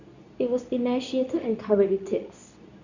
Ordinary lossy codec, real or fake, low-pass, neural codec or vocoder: none; fake; 7.2 kHz; codec, 24 kHz, 0.9 kbps, WavTokenizer, medium speech release version 2